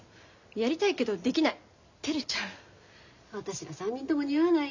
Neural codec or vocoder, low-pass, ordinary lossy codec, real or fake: none; 7.2 kHz; none; real